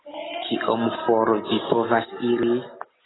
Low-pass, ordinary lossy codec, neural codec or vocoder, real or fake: 7.2 kHz; AAC, 16 kbps; none; real